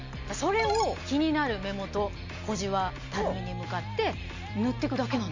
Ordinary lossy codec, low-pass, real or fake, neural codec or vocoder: MP3, 48 kbps; 7.2 kHz; real; none